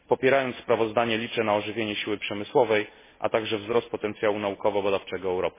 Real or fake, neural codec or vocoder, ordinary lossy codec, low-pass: real; none; MP3, 16 kbps; 3.6 kHz